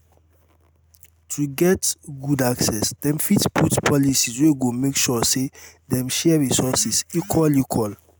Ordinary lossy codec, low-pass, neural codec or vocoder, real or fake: none; none; none; real